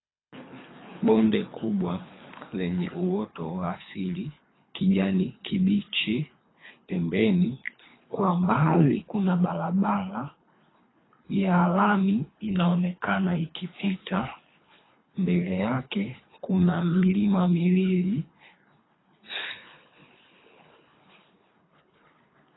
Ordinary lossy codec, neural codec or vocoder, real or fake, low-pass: AAC, 16 kbps; codec, 24 kHz, 3 kbps, HILCodec; fake; 7.2 kHz